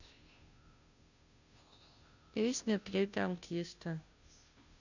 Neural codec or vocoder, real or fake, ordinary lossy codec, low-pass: codec, 16 kHz, 0.5 kbps, FunCodec, trained on Chinese and English, 25 frames a second; fake; none; 7.2 kHz